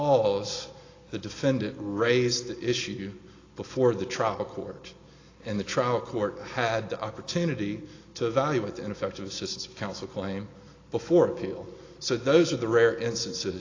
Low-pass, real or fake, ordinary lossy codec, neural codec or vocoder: 7.2 kHz; real; AAC, 32 kbps; none